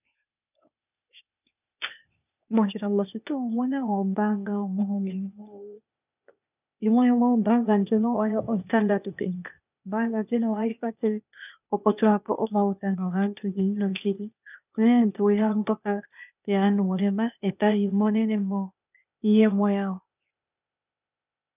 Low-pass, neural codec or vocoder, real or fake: 3.6 kHz; codec, 16 kHz, 0.8 kbps, ZipCodec; fake